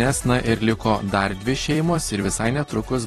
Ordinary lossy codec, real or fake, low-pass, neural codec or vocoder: AAC, 32 kbps; fake; 19.8 kHz; vocoder, 44.1 kHz, 128 mel bands every 256 samples, BigVGAN v2